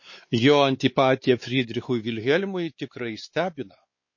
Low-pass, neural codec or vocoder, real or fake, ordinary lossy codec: 7.2 kHz; codec, 16 kHz, 4 kbps, X-Codec, WavLM features, trained on Multilingual LibriSpeech; fake; MP3, 32 kbps